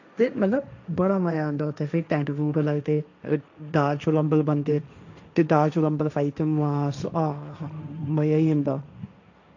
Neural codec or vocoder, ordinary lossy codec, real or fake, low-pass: codec, 16 kHz, 1.1 kbps, Voila-Tokenizer; none; fake; 7.2 kHz